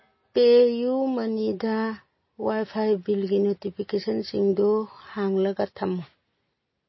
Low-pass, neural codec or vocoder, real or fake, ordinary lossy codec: 7.2 kHz; none; real; MP3, 24 kbps